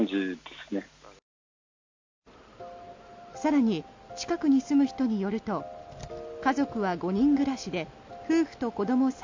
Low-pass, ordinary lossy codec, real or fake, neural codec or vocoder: 7.2 kHz; MP3, 48 kbps; real; none